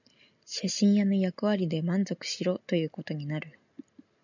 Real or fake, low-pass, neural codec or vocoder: real; 7.2 kHz; none